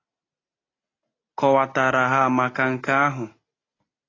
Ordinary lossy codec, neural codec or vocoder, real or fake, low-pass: AAC, 32 kbps; none; real; 7.2 kHz